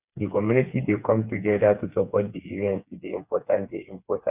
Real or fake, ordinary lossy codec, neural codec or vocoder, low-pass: fake; none; codec, 16 kHz, 4 kbps, FreqCodec, smaller model; 3.6 kHz